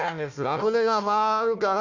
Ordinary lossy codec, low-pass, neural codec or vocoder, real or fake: none; 7.2 kHz; codec, 16 kHz, 1 kbps, FunCodec, trained on Chinese and English, 50 frames a second; fake